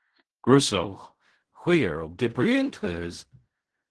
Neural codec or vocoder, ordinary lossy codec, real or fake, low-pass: codec, 16 kHz in and 24 kHz out, 0.4 kbps, LongCat-Audio-Codec, fine tuned four codebook decoder; Opus, 16 kbps; fake; 10.8 kHz